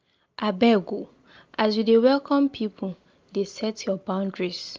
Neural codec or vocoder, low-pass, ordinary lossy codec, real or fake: none; 7.2 kHz; Opus, 24 kbps; real